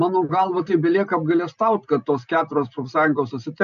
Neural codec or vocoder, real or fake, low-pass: none; real; 7.2 kHz